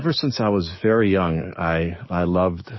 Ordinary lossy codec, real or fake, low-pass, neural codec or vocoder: MP3, 24 kbps; fake; 7.2 kHz; codec, 44.1 kHz, 7.8 kbps, DAC